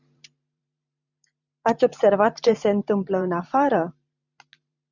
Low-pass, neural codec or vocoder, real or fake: 7.2 kHz; vocoder, 44.1 kHz, 128 mel bands every 512 samples, BigVGAN v2; fake